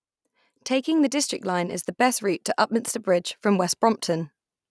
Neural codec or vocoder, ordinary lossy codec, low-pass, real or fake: none; none; none; real